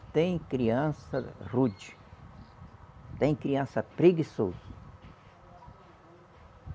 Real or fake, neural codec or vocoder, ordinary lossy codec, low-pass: real; none; none; none